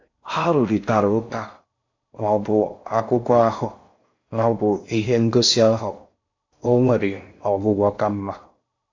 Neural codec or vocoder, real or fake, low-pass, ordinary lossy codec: codec, 16 kHz in and 24 kHz out, 0.6 kbps, FocalCodec, streaming, 4096 codes; fake; 7.2 kHz; AAC, 48 kbps